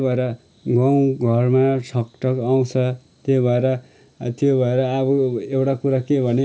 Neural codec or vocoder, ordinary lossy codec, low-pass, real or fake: none; none; none; real